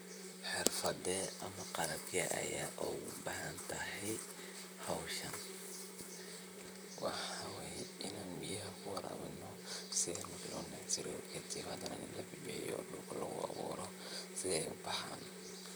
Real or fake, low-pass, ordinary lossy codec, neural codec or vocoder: fake; none; none; vocoder, 44.1 kHz, 128 mel bands, Pupu-Vocoder